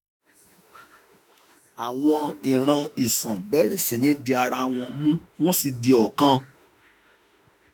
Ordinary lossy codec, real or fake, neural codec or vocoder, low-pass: none; fake; autoencoder, 48 kHz, 32 numbers a frame, DAC-VAE, trained on Japanese speech; none